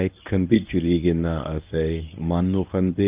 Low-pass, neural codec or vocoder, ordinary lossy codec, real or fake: 3.6 kHz; codec, 24 kHz, 0.9 kbps, WavTokenizer, medium speech release version 1; Opus, 24 kbps; fake